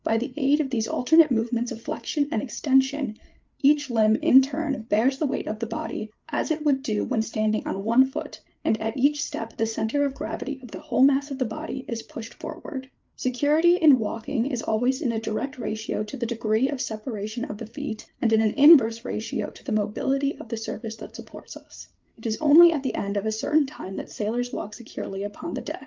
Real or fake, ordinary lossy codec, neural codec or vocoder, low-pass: fake; Opus, 24 kbps; codec, 16 kHz, 8 kbps, FreqCodec, larger model; 7.2 kHz